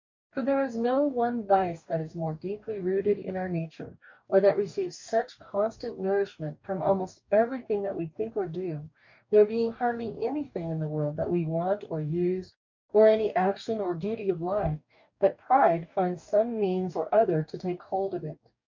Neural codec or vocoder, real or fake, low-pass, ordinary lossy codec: codec, 44.1 kHz, 2.6 kbps, DAC; fake; 7.2 kHz; MP3, 64 kbps